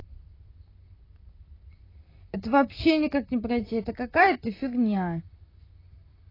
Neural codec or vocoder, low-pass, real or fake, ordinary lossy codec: vocoder, 44.1 kHz, 128 mel bands, Pupu-Vocoder; 5.4 kHz; fake; AAC, 24 kbps